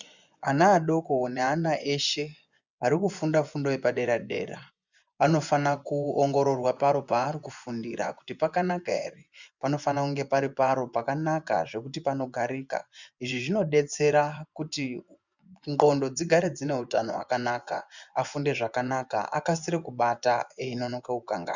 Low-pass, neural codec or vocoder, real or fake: 7.2 kHz; vocoder, 24 kHz, 100 mel bands, Vocos; fake